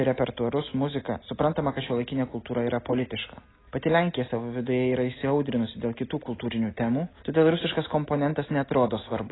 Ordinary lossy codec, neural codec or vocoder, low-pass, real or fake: AAC, 16 kbps; none; 7.2 kHz; real